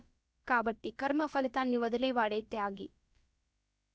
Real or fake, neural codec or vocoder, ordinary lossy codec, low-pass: fake; codec, 16 kHz, about 1 kbps, DyCAST, with the encoder's durations; none; none